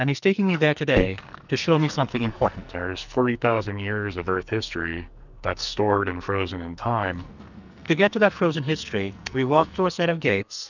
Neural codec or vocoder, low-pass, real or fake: codec, 44.1 kHz, 2.6 kbps, SNAC; 7.2 kHz; fake